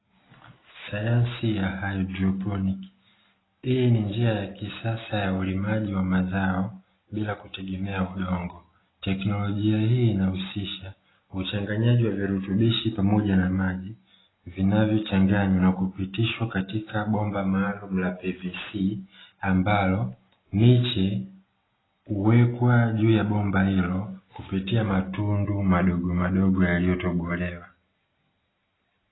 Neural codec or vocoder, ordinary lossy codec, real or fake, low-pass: none; AAC, 16 kbps; real; 7.2 kHz